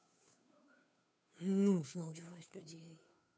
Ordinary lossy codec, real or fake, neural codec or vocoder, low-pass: none; fake; codec, 16 kHz, 2 kbps, FunCodec, trained on Chinese and English, 25 frames a second; none